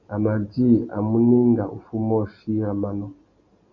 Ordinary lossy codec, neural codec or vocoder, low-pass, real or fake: Opus, 64 kbps; none; 7.2 kHz; real